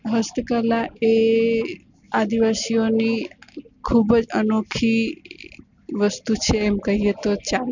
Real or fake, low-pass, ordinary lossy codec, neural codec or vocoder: real; 7.2 kHz; none; none